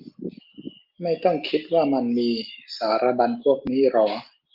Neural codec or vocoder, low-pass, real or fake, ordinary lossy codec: none; 5.4 kHz; real; Opus, 24 kbps